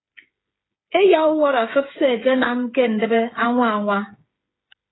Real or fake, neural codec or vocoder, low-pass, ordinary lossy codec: fake; codec, 16 kHz, 8 kbps, FreqCodec, smaller model; 7.2 kHz; AAC, 16 kbps